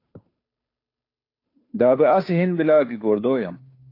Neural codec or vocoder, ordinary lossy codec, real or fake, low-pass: codec, 16 kHz, 2 kbps, FunCodec, trained on Chinese and English, 25 frames a second; AAC, 32 kbps; fake; 5.4 kHz